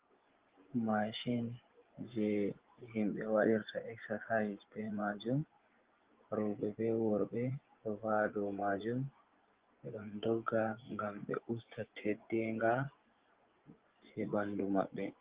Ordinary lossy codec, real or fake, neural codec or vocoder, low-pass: Opus, 16 kbps; fake; vocoder, 24 kHz, 100 mel bands, Vocos; 3.6 kHz